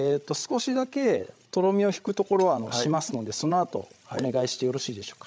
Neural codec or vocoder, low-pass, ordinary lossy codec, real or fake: codec, 16 kHz, 16 kbps, FreqCodec, larger model; none; none; fake